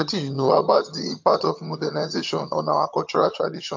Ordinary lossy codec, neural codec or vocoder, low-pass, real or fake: MP3, 48 kbps; vocoder, 22.05 kHz, 80 mel bands, HiFi-GAN; 7.2 kHz; fake